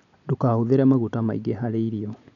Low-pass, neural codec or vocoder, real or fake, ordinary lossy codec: 7.2 kHz; none; real; none